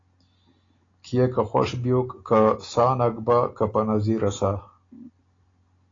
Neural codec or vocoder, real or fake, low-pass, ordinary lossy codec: none; real; 7.2 kHz; AAC, 32 kbps